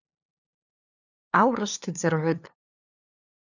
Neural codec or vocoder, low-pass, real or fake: codec, 16 kHz, 2 kbps, FunCodec, trained on LibriTTS, 25 frames a second; 7.2 kHz; fake